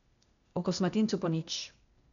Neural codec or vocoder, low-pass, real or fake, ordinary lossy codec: codec, 16 kHz, 0.8 kbps, ZipCodec; 7.2 kHz; fake; none